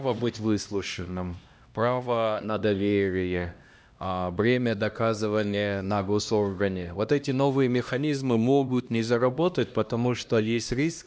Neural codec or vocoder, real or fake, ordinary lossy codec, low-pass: codec, 16 kHz, 1 kbps, X-Codec, HuBERT features, trained on LibriSpeech; fake; none; none